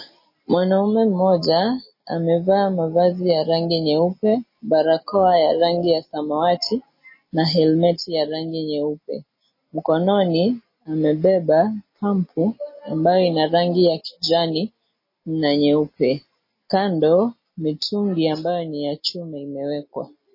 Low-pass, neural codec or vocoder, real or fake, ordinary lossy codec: 5.4 kHz; none; real; MP3, 24 kbps